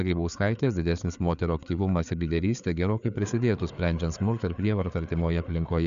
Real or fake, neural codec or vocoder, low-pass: fake; codec, 16 kHz, 4 kbps, FreqCodec, larger model; 7.2 kHz